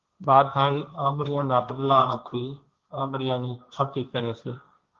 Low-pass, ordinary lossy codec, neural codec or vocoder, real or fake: 7.2 kHz; Opus, 32 kbps; codec, 16 kHz, 1.1 kbps, Voila-Tokenizer; fake